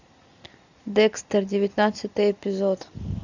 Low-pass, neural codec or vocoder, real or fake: 7.2 kHz; none; real